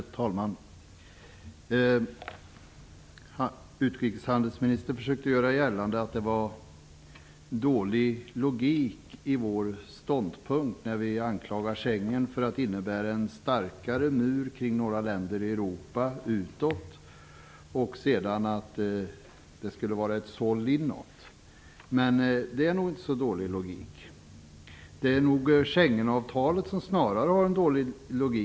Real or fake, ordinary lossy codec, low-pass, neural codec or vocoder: real; none; none; none